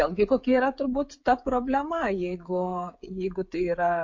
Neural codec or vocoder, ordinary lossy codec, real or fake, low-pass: codec, 16 kHz, 16 kbps, FreqCodec, smaller model; MP3, 48 kbps; fake; 7.2 kHz